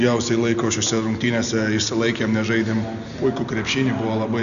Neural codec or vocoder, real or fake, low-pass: none; real; 7.2 kHz